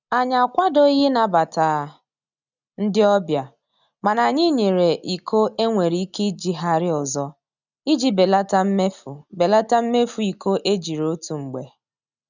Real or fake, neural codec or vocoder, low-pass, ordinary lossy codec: real; none; 7.2 kHz; none